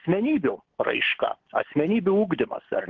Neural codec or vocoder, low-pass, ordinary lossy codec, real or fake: none; 7.2 kHz; Opus, 16 kbps; real